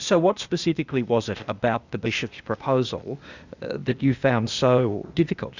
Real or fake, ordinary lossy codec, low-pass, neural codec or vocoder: fake; Opus, 64 kbps; 7.2 kHz; codec, 16 kHz, 0.8 kbps, ZipCodec